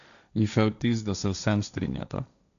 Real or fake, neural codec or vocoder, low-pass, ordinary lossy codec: fake; codec, 16 kHz, 1.1 kbps, Voila-Tokenizer; 7.2 kHz; none